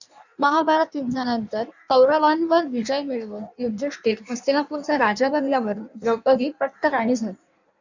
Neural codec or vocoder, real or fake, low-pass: codec, 16 kHz in and 24 kHz out, 1.1 kbps, FireRedTTS-2 codec; fake; 7.2 kHz